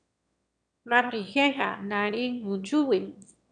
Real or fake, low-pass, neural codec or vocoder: fake; 9.9 kHz; autoencoder, 22.05 kHz, a latent of 192 numbers a frame, VITS, trained on one speaker